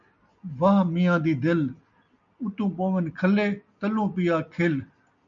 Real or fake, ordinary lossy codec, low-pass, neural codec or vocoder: real; MP3, 96 kbps; 7.2 kHz; none